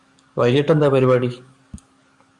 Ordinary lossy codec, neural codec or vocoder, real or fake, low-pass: Opus, 64 kbps; codec, 44.1 kHz, 7.8 kbps, DAC; fake; 10.8 kHz